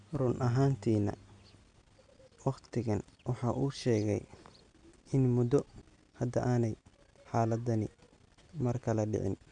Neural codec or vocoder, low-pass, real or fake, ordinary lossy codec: none; 9.9 kHz; real; none